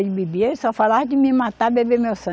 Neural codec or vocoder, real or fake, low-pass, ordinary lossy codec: none; real; none; none